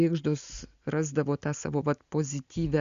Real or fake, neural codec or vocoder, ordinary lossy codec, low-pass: real; none; Opus, 64 kbps; 7.2 kHz